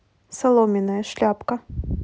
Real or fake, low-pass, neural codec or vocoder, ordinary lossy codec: real; none; none; none